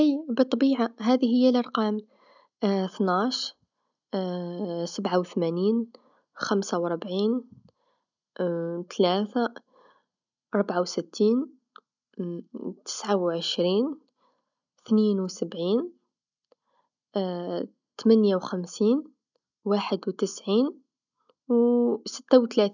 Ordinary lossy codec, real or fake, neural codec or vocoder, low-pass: none; real; none; 7.2 kHz